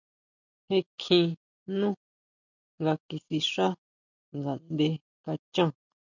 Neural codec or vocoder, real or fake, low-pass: none; real; 7.2 kHz